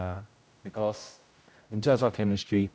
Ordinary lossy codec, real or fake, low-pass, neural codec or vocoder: none; fake; none; codec, 16 kHz, 0.5 kbps, X-Codec, HuBERT features, trained on general audio